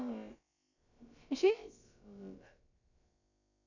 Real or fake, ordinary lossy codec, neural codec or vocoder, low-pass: fake; none; codec, 16 kHz, about 1 kbps, DyCAST, with the encoder's durations; 7.2 kHz